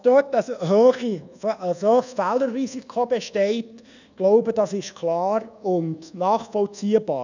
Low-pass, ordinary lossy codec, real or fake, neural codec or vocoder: 7.2 kHz; none; fake; codec, 24 kHz, 1.2 kbps, DualCodec